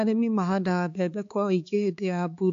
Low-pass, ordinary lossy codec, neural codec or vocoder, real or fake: 7.2 kHz; AAC, 48 kbps; codec, 16 kHz, 4 kbps, X-Codec, HuBERT features, trained on balanced general audio; fake